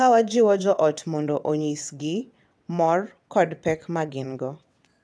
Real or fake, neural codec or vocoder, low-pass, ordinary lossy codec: fake; vocoder, 22.05 kHz, 80 mel bands, WaveNeXt; none; none